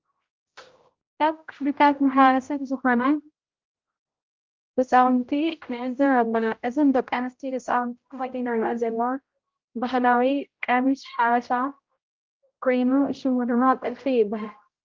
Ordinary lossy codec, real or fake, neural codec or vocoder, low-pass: Opus, 32 kbps; fake; codec, 16 kHz, 0.5 kbps, X-Codec, HuBERT features, trained on general audio; 7.2 kHz